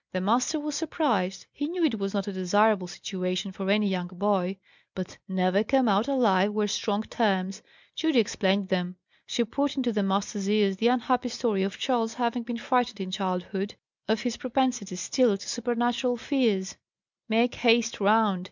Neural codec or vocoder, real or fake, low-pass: none; real; 7.2 kHz